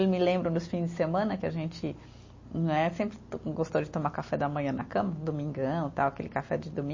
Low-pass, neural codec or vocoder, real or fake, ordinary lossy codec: 7.2 kHz; none; real; MP3, 32 kbps